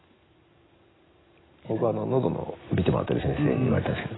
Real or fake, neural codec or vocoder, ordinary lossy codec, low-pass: real; none; AAC, 16 kbps; 7.2 kHz